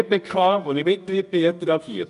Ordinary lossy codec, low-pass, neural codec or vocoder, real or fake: none; 10.8 kHz; codec, 24 kHz, 0.9 kbps, WavTokenizer, medium music audio release; fake